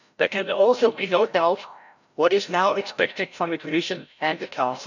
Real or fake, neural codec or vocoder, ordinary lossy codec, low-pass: fake; codec, 16 kHz, 0.5 kbps, FreqCodec, larger model; none; 7.2 kHz